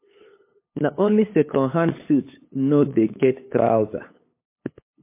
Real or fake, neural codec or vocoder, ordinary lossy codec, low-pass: fake; codec, 16 kHz, 8 kbps, FunCodec, trained on LibriTTS, 25 frames a second; MP3, 24 kbps; 3.6 kHz